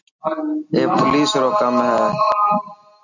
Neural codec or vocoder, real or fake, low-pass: none; real; 7.2 kHz